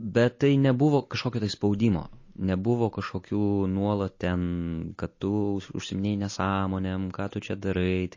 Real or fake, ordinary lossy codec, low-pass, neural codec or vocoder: real; MP3, 32 kbps; 7.2 kHz; none